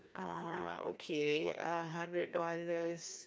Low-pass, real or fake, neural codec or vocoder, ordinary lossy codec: none; fake; codec, 16 kHz, 1 kbps, FreqCodec, larger model; none